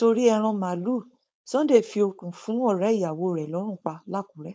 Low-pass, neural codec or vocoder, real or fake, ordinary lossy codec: none; codec, 16 kHz, 4.8 kbps, FACodec; fake; none